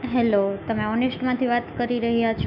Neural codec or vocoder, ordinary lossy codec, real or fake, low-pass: autoencoder, 48 kHz, 128 numbers a frame, DAC-VAE, trained on Japanese speech; none; fake; 5.4 kHz